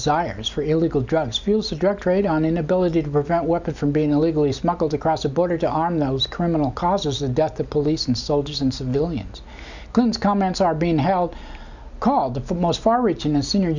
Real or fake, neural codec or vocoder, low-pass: real; none; 7.2 kHz